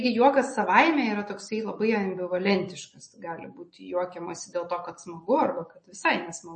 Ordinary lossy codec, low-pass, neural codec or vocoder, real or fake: MP3, 32 kbps; 10.8 kHz; none; real